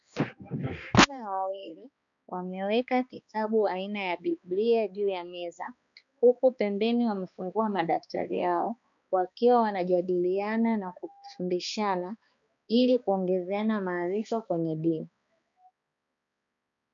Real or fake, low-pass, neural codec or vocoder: fake; 7.2 kHz; codec, 16 kHz, 2 kbps, X-Codec, HuBERT features, trained on balanced general audio